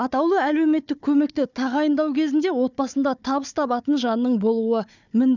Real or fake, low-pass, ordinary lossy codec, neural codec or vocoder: fake; 7.2 kHz; none; codec, 16 kHz, 4 kbps, FunCodec, trained on Chinese and English, 50 frames a second